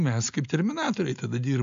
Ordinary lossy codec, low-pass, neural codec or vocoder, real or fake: AAC, 64 kbps; 7.2 kHz; none; real